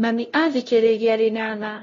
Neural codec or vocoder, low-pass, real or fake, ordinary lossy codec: codec, 16 kHz, 0.5 kbps, FunCodec, trained on LibriTTS, 25 frames a second; 7.2 kHz; fake; AAC, 32 kbps